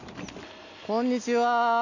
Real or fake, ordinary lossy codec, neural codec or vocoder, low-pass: real; none; none; 7.2 kHz